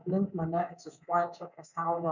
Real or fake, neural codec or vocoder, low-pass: fake; codec, 24 kHz, 3.1 kbps, DualCodec; 7.2 kHz